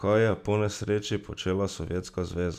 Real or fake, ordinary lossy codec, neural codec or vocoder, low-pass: real; none; none; 14.4 kHz